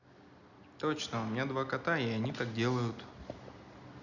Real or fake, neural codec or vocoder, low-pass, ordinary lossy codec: real; none; 7.2 kHz; none